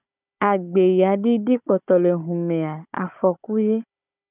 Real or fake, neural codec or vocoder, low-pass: fake; codec, 16 kHz, 16 kbps, FunCodec, trained on Chinese and English, 50 frames a second; 3.6 kHz